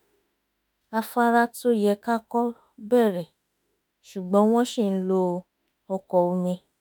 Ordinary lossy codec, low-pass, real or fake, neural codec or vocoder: none; none; fake; autoencoder, 48 kHz, 32 numbers a frame, DAC-VAE, trained on Japanese speech